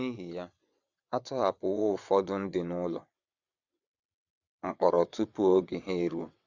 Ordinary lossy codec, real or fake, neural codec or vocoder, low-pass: Opus, 64 kbps; fake; vocoder, 22.05 kHz, 80 mel bands, WaveNeXt; 7.2 kHz